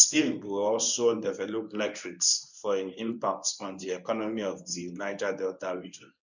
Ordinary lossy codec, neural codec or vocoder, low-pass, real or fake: none; codec, 24 kHz, 0.9 kbps, WavTokenizer, medium speech release version 1; 7.2 kHz; fake